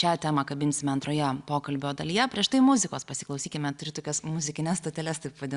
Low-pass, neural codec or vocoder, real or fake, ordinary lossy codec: 10.8 kHz; none; real; Opus, 64 kbps